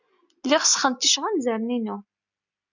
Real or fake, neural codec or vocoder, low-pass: real; none; 7.2 kHz